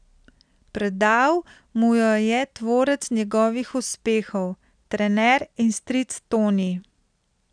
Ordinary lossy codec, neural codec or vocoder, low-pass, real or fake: none; none; 9.9 kHz; real